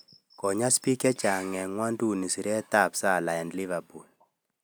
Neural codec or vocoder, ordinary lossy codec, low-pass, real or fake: none; none; none; real